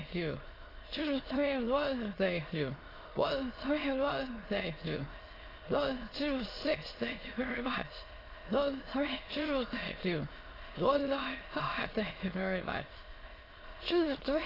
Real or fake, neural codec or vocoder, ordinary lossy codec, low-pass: fake; autoencoder, 22.05 kHz, a latent of 192 numbers a frame, VITS, trained on many speakers; AAC, 24 kbps; 5.4 kHz